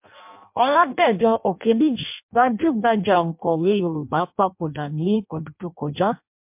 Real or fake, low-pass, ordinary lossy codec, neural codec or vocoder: fake; 3.6 kHz; MP3, 32 kbps; codec, 16 kHz in and 24 kHz out, 0.6 kbps, FireRedTTS-2 codec